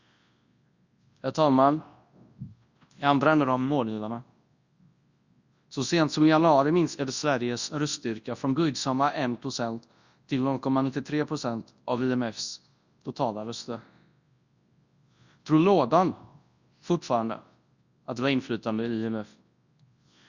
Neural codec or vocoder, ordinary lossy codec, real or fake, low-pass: codec, 24 kHz, 0.9 kbps, WavTokenizer, large speech release; Opus, 64 kbps; fake; 7.2 kHz